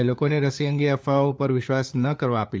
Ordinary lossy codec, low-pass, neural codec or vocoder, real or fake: none; none; codec, 16 kHz, 4 kbps, FunCodec, trained on LibriTTS, 50 frames a second; fake